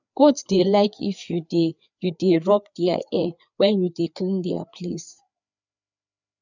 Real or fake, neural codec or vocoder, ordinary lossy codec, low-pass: fake; codec, 16 kHz, 4 kbps, FreqCodec, larger model; none; 7.2 kHz